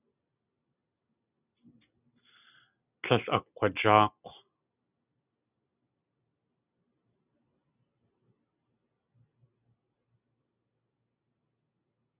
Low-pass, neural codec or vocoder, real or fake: 3.6 kHz; none; real